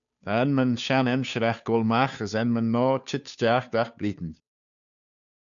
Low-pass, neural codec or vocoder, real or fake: 7.2 kHz; codec, 16 kHz, 2 kbps, FunCodec, trained on Chinese and English, 25 frames a second; fake